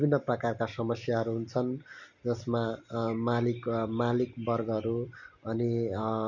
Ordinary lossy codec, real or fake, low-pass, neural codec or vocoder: none; real; 7.2 kHz; none